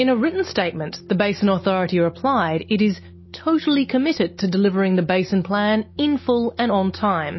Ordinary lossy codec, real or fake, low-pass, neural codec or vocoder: MP3, 24 kbps; real; 7.2 kHz; none